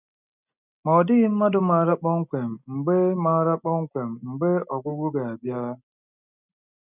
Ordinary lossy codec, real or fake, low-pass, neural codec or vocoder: none; real; 3.6 kHz; none